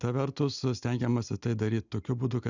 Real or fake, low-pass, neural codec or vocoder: real; 7.2 kHz; none